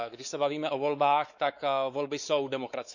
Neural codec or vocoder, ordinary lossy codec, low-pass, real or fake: codec, 16 kHz, 4 kbps, X-Codec, WavLM features, trained on Multilingual LibriSpeech; none; 7.2 kHz; fake